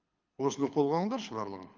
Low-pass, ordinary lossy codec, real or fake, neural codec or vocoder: 7.2 kHz; Opus, 24 kbps; fake; codec, 24 kHz, 6 kbps, HILCodec